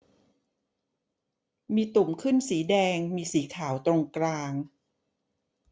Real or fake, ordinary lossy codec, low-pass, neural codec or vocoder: real; none; none; none